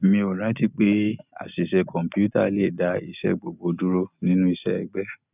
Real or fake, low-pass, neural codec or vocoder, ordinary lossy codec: real; 3.6 kHz; none; none